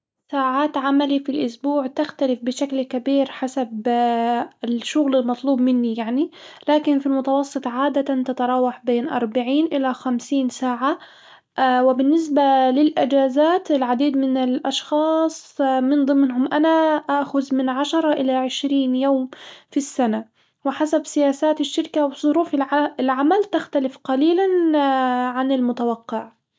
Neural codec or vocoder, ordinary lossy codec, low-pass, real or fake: none; none; none; real